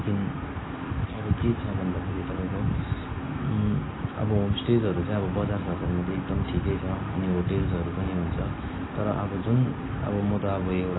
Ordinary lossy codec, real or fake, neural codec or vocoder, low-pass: AAC, 16 kbps; real; none; 7.2 kHz